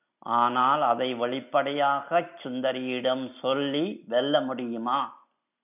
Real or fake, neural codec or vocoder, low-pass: real; none; 3.6 kHz